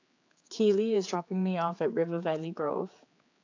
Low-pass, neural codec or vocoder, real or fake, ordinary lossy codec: 7.2 kHz; codec, 16 kHz, 4 kbps, X-Codec, HuBERT features, trained on general audio; fake; AAC, 48 kbps